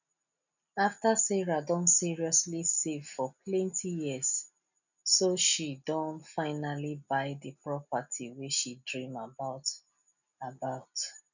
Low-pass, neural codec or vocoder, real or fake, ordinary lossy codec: 7.2 kHz; none; real; none